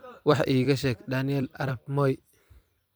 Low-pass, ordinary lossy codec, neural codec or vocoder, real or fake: none; none; vocoder, 44.1 kHz, 128 mel bands every 256 samples, BigVGAN v2; fake